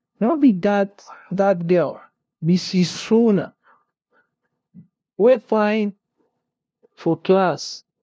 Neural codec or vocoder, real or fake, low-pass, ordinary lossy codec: codec, 16 kHz, 0.5 kbps, FunCodec, trained on LibriTTS, 25 frames a second; fake; none; none